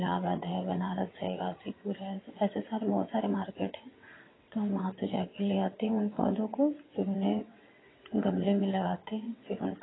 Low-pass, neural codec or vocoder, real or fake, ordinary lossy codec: 7.2 kHz; vocoder, 22.05 kHz, 80 mel bands, WaveNeXt; fake; AAC, 16 kbps